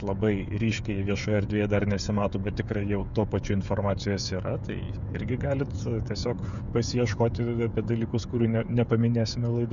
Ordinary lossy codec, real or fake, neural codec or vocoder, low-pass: MP3, 96 kbps; fake; codec, 16 kHz, 16 kbps, FreqCodec, smaller model; 7.2 kHz